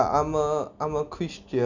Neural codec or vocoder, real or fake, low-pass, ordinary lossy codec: none; real; 7.2 kHz; none